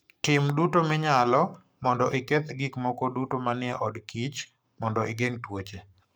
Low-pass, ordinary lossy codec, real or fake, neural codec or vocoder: none; none; fake; codec, 44.1 kHz, 7.8 kbps, Pupu-Codec